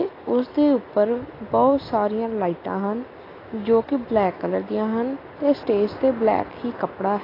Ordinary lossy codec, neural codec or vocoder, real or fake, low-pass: AAC, 24 kbps; none; real; 5.4 kHz